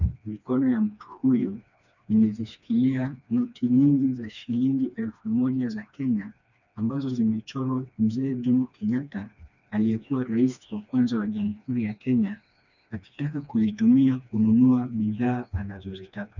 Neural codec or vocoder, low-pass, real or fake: codec, 16 kHz, 2 kbps, FreqCodec, smaller model; 7.2 kHz; fake